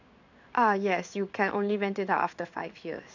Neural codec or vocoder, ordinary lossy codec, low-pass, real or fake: codec, 16 kHz in and 24 kHz out, 1 kbps, XY-Tokenizer; none; 7.2 kHz; fake